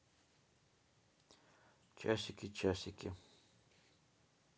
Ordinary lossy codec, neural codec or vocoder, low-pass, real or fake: none; none; none; real